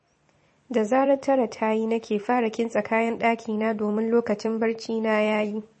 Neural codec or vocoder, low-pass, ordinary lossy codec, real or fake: vocoder, 44.1 kHz, 128 mel bands every 256 samples, BigVGAN v2; 10.8 kHz; MP3, 32 kbps; fake